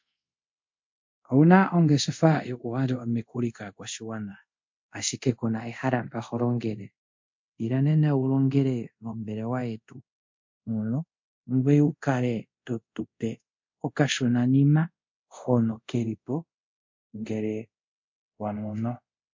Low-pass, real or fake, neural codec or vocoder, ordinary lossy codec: 7.2 kHz; fake; codec, 24 kHz, 0.5 kbps, DualCodec; MP3, 48 kbps